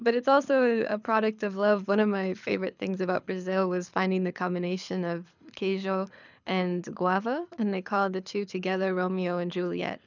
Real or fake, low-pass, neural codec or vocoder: fake; 7.2 kHz; codec, 24 kHz, 6 kbps, HILCodec